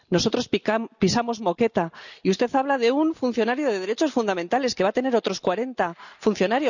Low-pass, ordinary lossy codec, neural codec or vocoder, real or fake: 7.2 kHz; none; none; real